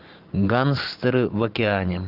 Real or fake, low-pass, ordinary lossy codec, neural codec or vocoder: fake; 5.4 kHz; Opus, 24 kbps; vocoder, 44.1 kHz, 128 mel bands every 512 samples, BigVGAN v2